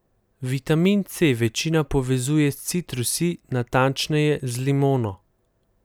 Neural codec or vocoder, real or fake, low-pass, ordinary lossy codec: none; real; none; none